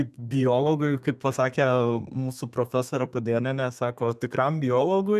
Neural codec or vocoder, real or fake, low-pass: codec, 32 kHz, 1.9 kbps, SNAC; fake; 14.4 kHz